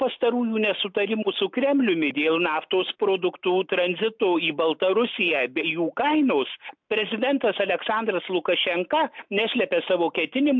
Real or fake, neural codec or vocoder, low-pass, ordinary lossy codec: real; none; 7.2 kHz; MP3, 64 kbps